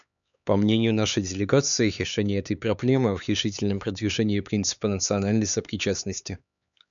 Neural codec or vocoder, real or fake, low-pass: codec, 16 kHz, 4 kbps, X-Codec, HuBERT features, trained on LibriSpeech; fake; 7.2 kHz